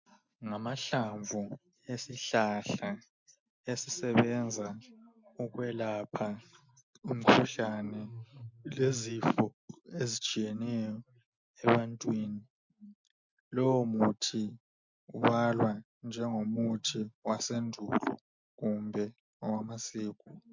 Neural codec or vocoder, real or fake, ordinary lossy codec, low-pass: none; real; MP3, 48 kbps; 7.2 kHz